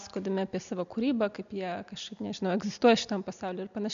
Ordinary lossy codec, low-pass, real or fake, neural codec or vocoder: MP3, 64 kbps; 7.2 kHz; real; none